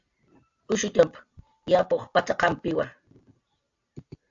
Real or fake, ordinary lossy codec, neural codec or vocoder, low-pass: real; AAC, 64 kbps; none; 7.2 kHz